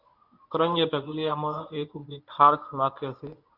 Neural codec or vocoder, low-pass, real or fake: codec, 24 kHz, 0.9 kbps, WavTokenizer, medium speech release version 1; 5.4 kHz; fake